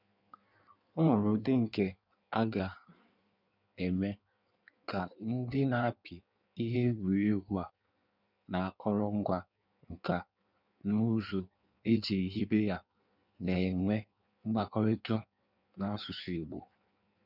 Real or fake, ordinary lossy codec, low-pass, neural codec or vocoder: fake; none; 5.4 kHz; codec, 16 kHz in and 24 kHz out, 1.1 kbps, FireRedTTS-2 codec